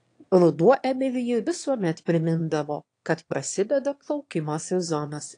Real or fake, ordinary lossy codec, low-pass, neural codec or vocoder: fake; AAC, 48 kbps; 9.9 kHz; autoencoder, 22.05 kHz, a latent of 192 numbers a frame, VITS, trained on one speaker